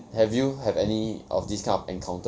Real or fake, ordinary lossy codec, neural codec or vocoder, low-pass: real; none; none; none